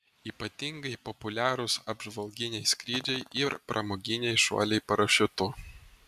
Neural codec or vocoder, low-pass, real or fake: none; 14.4 kHz; real